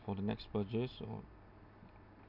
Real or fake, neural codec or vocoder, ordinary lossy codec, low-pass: real; none; none; 5.4 kHz